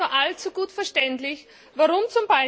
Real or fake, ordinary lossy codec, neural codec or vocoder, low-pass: real; none; none; none